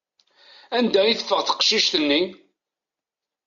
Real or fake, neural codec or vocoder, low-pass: real; none; 7.2 kHz